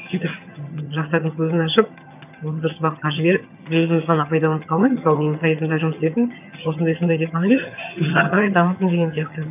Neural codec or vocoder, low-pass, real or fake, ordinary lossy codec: vocoder, 22.05 kHz, 80 mel bands, HiFi-GAN; 3.6 kHz; fake; none